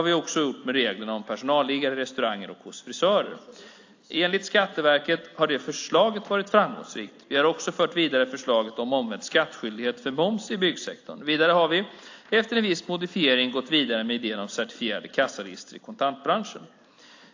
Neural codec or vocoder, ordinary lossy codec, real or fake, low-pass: none; AAC, 48 kbps; real; 7.2 kHz